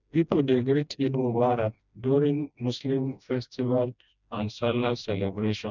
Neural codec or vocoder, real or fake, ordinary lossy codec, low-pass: codec, 16 kHz, 1 kbps, FreqCodec, smaller model; fake; none; 7.2 kHz